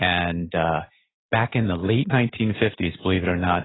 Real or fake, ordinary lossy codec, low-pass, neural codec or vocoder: real; AAC, 16 kbps; 7.2 kHz; none